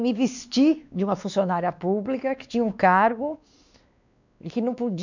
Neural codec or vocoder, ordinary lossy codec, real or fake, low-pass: codec, 16 kHz, 2 kbps, X-Codec, WavLM features, trained on Multilingual LibriSpeech; none; fake; 7.2 kHz